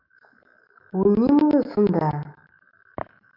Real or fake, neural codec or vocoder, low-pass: fake; autoencoder, 48 kHz, 128 numbers a frame, DAC-VAE, trained on Japanese speech; 5.4 kHz